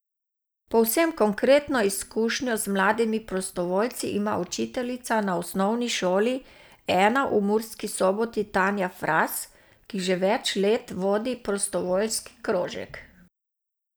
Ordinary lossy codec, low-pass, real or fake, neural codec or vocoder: none; none; real; none